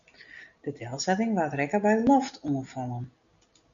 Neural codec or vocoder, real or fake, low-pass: none; real; 7.2 kHz